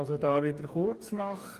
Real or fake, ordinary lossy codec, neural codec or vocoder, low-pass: fake; Opus, 32 kbps; codec, 44.1 kHz, 2.6 kbps, DAC; 14.4 kHz